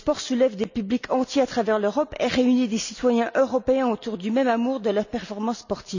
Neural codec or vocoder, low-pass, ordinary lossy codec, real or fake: none; 7.2 kHz; none; real